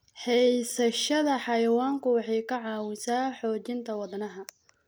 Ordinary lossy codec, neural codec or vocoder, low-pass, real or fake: none; none; none; real